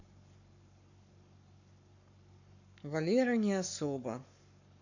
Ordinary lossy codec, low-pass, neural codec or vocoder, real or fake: none; 7.2 kHz; codec, 44.1 kHz, 7.8 kbps, Pupu-Codec; fake